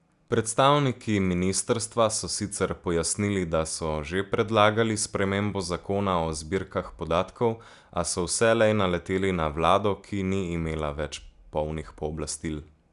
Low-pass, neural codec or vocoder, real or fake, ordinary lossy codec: 10.8 kHz; none; real; none